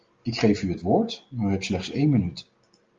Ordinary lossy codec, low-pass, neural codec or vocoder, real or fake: Opus, 32 kbps; 7.2 kHz; none; real